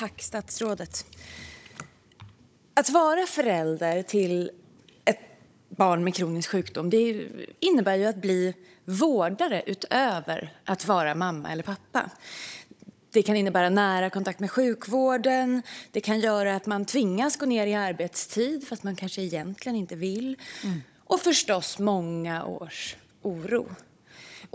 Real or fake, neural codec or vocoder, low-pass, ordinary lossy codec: fake; codec, 16 kHz, 16 kbps, FunCodec, trained on Chinese and English, 50 frames a second; none; none